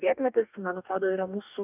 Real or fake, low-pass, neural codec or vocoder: fake; 3.6 kHz; codec, 44.1 kHz, 2.6 kbps, DAC